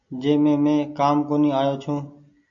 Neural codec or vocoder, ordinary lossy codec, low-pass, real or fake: none; AAC, 64 kbps; 7.2 kHz; real